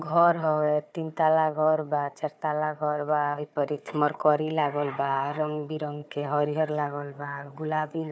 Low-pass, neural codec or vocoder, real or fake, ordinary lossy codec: none; codec, 16 kHz, 4 kbps, FunCodec, trained on Chinese and English, 50 frames a second; fake; none